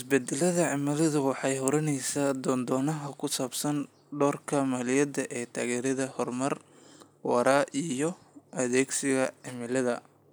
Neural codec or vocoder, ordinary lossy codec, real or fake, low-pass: none; none; real; none